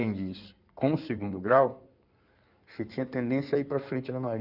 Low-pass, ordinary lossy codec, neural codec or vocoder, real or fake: 5.4 kHz; none; codec, 16 kHz in and 24 kHz out, 2.2 kbps, FireRedTTS-2 codec; fake